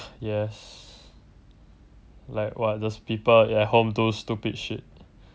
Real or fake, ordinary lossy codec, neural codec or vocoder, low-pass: real; none; none; none